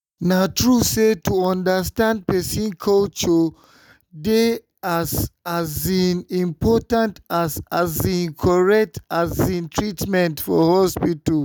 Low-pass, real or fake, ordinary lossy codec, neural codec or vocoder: none; real; none; none